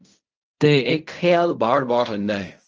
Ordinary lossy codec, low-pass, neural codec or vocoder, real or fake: Opus, 24 kbps; 7.2 kHz; codec, 16 kHz in and 24 kHz out, 0.4 kbps, LongCat-Audio-Codec, fine tuned four codebook decoder; fake